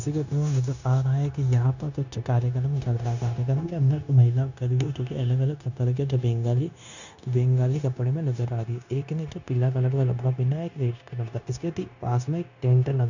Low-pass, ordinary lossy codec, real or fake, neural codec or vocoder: 7.2 kHz; none; fake; codec, 16 kHz, 0.9 kbps, LongCat-Audio-Codec